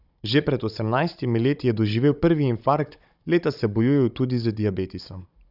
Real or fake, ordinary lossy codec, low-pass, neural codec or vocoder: fake; none; 5.4 kHz; codec, 16 kHz, 16 kbps, FunCodec, trained on Chinese and English, 50 frames a second